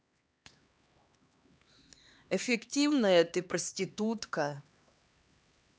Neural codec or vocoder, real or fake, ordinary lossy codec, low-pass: codec, 16 kHz, 2 kbps, X-Codec, HuBERT features, trained on LibriSpeech; fake; none; none